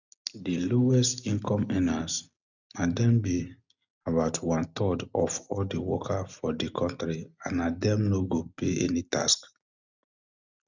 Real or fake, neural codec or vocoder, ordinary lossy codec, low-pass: real; none; none; 7.2 kHz